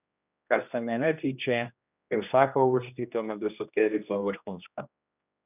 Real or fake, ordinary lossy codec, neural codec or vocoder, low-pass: fake; none; codec, 16 kHz, 1 kbps, X-Codec, HuBERT features, trained on general audio; 3.6 kHz